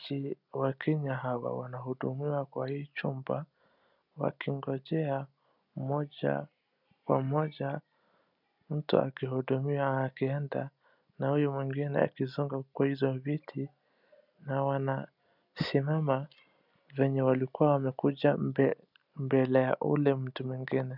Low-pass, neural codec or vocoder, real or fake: 5.4 kHz; none; real